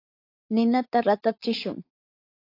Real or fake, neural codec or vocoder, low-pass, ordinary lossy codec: fake; codec, 16 kHz, 4.8 kbps, FACodec; 5.4 kHz; AAC, 32 kbps